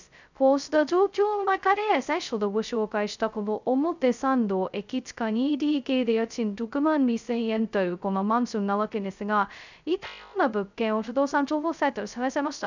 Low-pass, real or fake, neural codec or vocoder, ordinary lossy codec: 7.2 kHz; fake; codec, 16 kHz, 0.2 kbps, FocalCodec; none